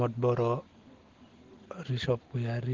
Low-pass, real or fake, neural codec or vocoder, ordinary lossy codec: 7.2 kHz; real; none; Opus, 16 kbps